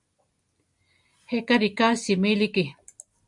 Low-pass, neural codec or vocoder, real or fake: 10.8 kHz; none; real